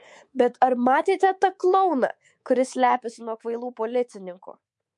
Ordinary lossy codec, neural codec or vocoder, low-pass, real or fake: MP3, 64 kbps; codec, 24 kHz, 3.1 kbps, DualCodec; 10.8 kHz; fake